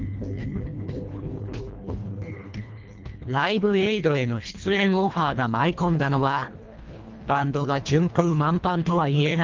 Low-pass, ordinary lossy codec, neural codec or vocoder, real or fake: 7.2 kHz; Opus, 32 kbps; codec, 24 kHz, 1.5 kbps, HILCodec; fake